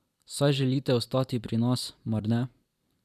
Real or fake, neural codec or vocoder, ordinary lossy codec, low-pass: fake; vocoder, 44.1 kHz, 128 mel bands every 256 samples, BigVGAN v2; none; 14.4 kHz